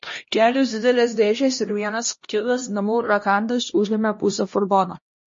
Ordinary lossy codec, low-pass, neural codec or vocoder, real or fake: MP3, 32 kbps; 7.2 kHz; codec, 16 kHz, 1 kbps, X-Codec, HuBERT features, trained on LibriSpeech; fake